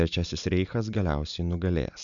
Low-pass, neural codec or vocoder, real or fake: 7.2 kHz; none; real